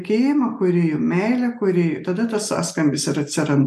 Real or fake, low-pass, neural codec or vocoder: real; 14.4 kHz; none